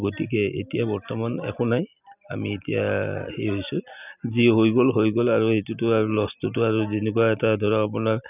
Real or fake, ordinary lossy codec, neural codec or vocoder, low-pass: real; none; none; 3.6 kHz